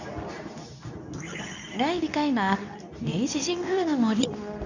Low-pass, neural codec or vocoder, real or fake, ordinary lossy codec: 7.2 kHz; codec, 24 kHz, 0.9 kbps, WavTokenizer, medium speech release version 2; fake; none